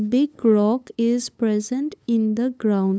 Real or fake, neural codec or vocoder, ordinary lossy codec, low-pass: fake; codec, 16 kHz, 8 kbps, FunCodec, trained on LibriTTS, 25 frames a second; none; none